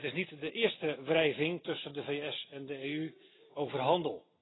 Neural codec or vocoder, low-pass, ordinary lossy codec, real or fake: none; 7.2 kHz; AAC, 16 kbps; real